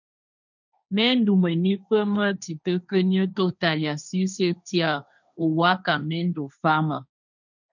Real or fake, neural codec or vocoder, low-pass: fake; codec, 16 kHz, 1.1 kbps, Voila-Tokenizer; 7.2 kHz